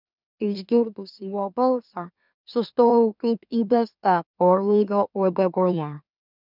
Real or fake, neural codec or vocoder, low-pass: fake; autoencoder, 44.1 kHz, a latent of 192 numbers a frame, MeloTTS; 5.4 kHz